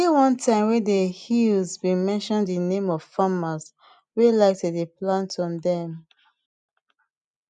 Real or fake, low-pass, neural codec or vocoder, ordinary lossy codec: real; 10.8 kHz; none; none